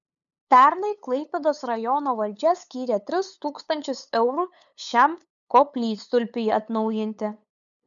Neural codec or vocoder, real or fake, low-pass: codec, 16 kHz, 8 kbps, FunCodec, trained on LibriTTS, 25 frames a second; fake; 7.2 kHz